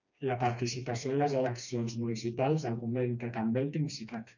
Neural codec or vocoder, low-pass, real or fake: codec, 16 kHz, 2 kbps, FreqCodec, smaller model; 7.2 kHz; fake